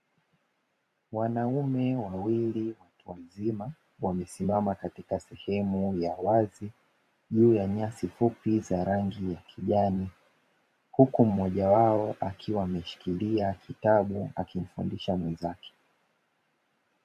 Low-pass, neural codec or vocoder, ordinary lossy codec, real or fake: 14.4 kHz; vocoder, 44.1 kHz, 128 mel bands every 512 samples, BigVGAN v2; MP3, 96 kbps; fake